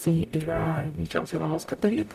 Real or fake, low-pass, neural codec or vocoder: fake; 14.4 kHz; codec, 44.1 kHz, 0.9 kbps, DAC